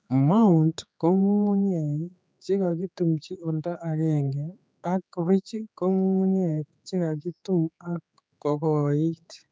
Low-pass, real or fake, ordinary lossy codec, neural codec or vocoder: none; fake; none; codec, 16 kHz, 4 kbps, X-Codec, HuBERT features, trained on general audio